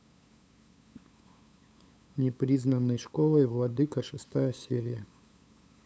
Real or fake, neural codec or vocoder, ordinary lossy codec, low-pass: fake; codec, 16 kHz, 8 kbps, FunCodec, trained on LibriTTS, 25 frames a second; none; none